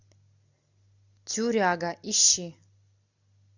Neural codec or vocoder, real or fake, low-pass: none; real; 7.2 kHz